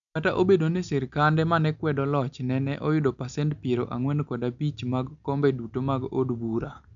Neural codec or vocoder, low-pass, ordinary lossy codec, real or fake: none; 7.2 kHz; none; real